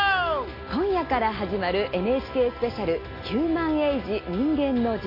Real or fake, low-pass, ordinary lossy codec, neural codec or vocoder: real; 5.4 kHz; AAC, 24 kbps; none